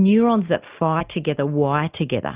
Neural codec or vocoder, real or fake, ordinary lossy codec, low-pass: none; real; Opus, 32 kbps; 3.6 kHz